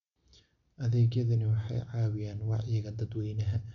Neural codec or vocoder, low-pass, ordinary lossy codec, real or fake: none; 7.2 kHz; MP3, 64 kbps; real